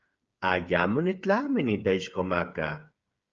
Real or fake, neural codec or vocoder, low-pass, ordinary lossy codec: fake; codec, 16 kHz, 8 kbps, FreqCodec, smaller model; 7.2 kHz; Opus, 24 kbps